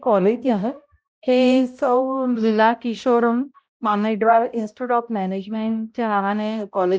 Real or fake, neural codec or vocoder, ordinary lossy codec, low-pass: fake; codec, 16 kHz, 0.5 kbps, X-Codec, HuBERT features, trained on balanced general audio; none; none